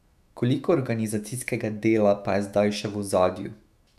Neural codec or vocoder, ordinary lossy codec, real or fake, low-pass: autoencoder, 48 kHz, 128 numbers a frame, DAC-VAE, trained on Japanese speech; none; fake; 14.4 kHz